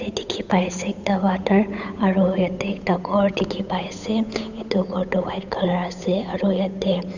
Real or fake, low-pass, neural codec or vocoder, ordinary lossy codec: fake; 7.2 kHz; codec, 16 kHz, 8 kbps, FunCodec, trained on Chinese and English, 25 frames a second; none